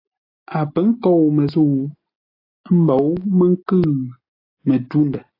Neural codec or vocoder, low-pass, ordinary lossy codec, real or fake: none; 5.4 kHz; AAC, 24 kbps; real